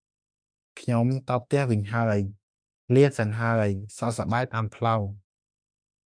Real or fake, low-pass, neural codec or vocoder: fake; 9.9 kHz; autoencoder, 48 kHz, 32 numbers a frame, DAC-VAE, trained on Japanese speech